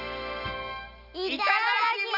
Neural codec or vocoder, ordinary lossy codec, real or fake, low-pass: none; none; real; 5.4 kHz